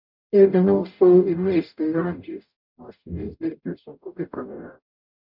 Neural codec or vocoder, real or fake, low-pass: codec, 44.1 kHz, 0.9 kbps, DAC; fake; 5.4 kHz